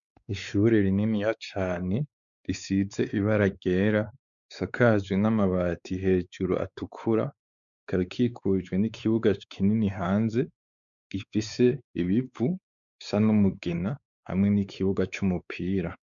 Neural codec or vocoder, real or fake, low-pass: codec, 16 kHz, 4 kbps, X-Codec, WavLM features, trained on Multilingual LibriSpeech; fake; 7.2 kHz